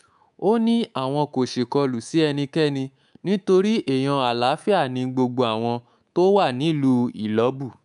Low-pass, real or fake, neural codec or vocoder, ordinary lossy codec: 10.8 kHz; fake; codec, 24 kHz, 3.1 kbps, DualCodec; none